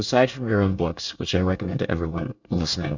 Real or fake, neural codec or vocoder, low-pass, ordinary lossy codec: fake; codec, 24 kHz, 1 kbps, SNAC; 7.2 kHz; Opus, 64 kbps